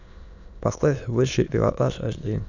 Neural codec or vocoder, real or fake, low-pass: autoencoder, 22.05 kHz, a latent of 192 numbers a frame, VITS, trained on many speakers; fake; 7.2 kHz